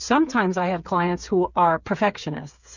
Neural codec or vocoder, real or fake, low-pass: codec, 16 kHz, 8 kbps, FreqCodec, smaller model; fake; 7.2 kHz